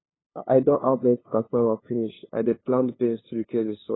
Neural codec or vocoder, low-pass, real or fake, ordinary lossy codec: codec, 16 kHz, 2 kbps, FunCodec, trained on LibriTTS, 25 frames a second; 7.2 kHz; fake; AAC, 16 kbps